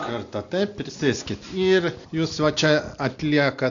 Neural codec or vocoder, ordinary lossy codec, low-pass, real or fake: none; AAC, 64 kbps; 7.2 kHz; real